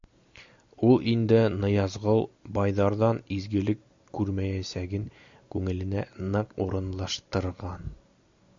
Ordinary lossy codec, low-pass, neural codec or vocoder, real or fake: MP3, 64 kbps; 7.2 kHz; none; real